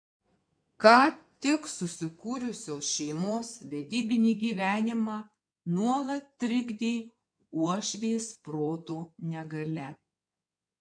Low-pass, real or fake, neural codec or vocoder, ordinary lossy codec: 9.9 kHz; fake; codec, 16 kHz in and 24 kHz out, 2.2 kbps, FireRedTTS-2 codec; MP3, 96 kbps